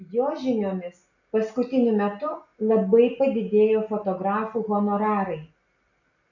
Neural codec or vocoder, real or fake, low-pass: none; real; 7.2 kHz